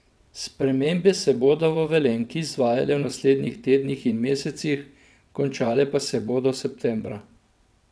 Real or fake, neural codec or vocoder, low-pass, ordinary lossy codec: fake; vocoder, 22.05 kHz, 80 mel bands, WaveNeXt; none; none